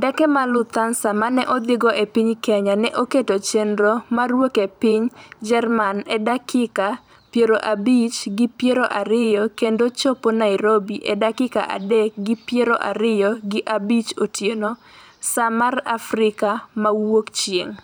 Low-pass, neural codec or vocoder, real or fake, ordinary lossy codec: none; vocoder, 44.1 kHz, 128 mel bands every 512 samples, BigVGAN v2; fake; none